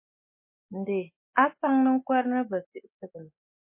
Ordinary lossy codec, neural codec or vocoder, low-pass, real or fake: MP3, 24 kbps; none; 3.6 kHz; real